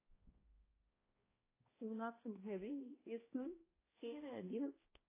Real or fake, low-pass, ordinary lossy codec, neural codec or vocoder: fake; 3.6 kHz; AAC, 16 kbps; codec, 16 kHz, 1 kbps, X-Codec, HuBERT features, trained on balanced general audio